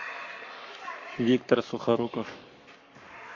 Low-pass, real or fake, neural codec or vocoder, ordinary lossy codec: 7.2 kHz; fake; codec, 44.1 kHz, 7.8 kbps, Pupu-Codec; AAC, 48 kbps